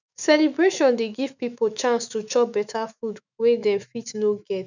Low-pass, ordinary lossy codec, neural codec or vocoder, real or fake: 7.2 kHz; none; autoencoder, 48 kHz, 128 numbers a frame, DAC-VAE, trained on Japanese speech; fake